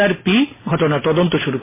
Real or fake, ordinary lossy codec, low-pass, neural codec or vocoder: real; MP3, 16 kbps; 3.6 kHz; none